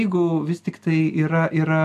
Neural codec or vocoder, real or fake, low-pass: none; real; 14.4 kHz